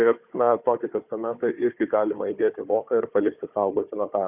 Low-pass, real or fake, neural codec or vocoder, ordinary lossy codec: 3.6 kHz; fake; codec, 16 kHz, 4 kbps, FunCodec, trained on Chinese and English, 50 frames a second; Opus, 64 kbps